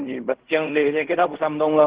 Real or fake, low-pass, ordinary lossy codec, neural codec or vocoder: fake; 3.6 kHz; Opus, 16 kbps; codec, 16 kHz in and 24 kHz out, 0.4 kbps, LongCat-Audio-Codec, fine tuned four codebook decoder